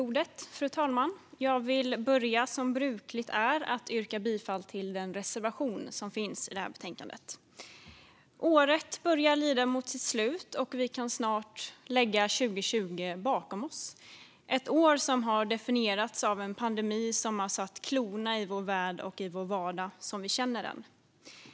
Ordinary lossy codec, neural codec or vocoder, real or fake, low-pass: none; none; real; none